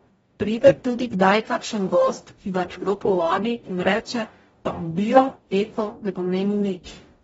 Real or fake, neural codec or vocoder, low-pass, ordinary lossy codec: fake; codec, 44.1 kHz, 0.9 kbps, DAC; 19.8 kHz; AAC, 24 kbps